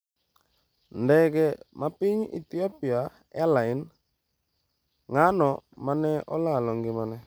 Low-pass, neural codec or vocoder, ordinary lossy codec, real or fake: none; none; none; real